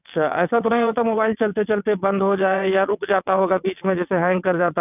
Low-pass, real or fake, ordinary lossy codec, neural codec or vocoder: 3.6 kHz; fake; none; vocoder, 22.05 kHz, 80 mel bands, WaveNeXt